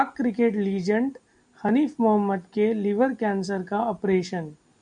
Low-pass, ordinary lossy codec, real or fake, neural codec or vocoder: 9.9 kHz; MP3, 96 kbps; real; none